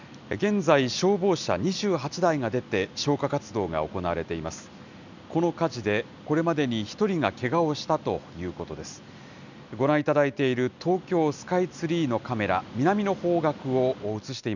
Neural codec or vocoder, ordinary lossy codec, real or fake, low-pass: none; none; real; 7.2 kHz